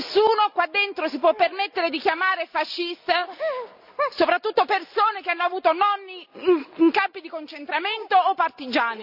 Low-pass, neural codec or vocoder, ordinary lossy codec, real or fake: 5.4 kHz; none; Opus, 64 kbps; real